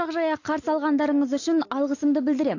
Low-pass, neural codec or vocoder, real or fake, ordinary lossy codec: 7.2 kHz; none; real; AAC, 48 kbps